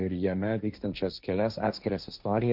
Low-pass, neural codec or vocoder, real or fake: 5.4 kHz; codec, 16 kHz, 1.1 kbps, Voila-Tokenizer; fake